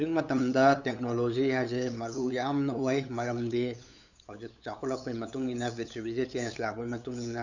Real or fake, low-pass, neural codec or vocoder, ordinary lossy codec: fake; 7.2 kHz; codec, 16 kHz, 8 kbps, FunCodec, trained on LibriTTS, 25 frames a second; none